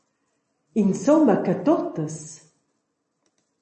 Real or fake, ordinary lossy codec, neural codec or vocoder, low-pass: real; MP3, 32 kbps; none; 10.8 kHz